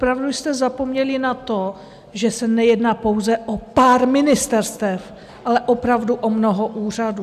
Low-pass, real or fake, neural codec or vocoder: 14.4 kHz; real; none